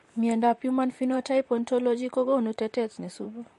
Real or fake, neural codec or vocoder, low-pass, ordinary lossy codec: fake; vocoder, 44.1 kHz, 128 mel bands, Pupu-Vocoder; 14.4 kHz; MP3, 48 kbps